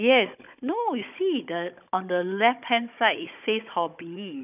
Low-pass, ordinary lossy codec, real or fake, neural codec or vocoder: 3.6 kHz; none; fake; codec, 16 kHz, 16 kbps, FunCodec, trained on Chinese and English, 50 frames a second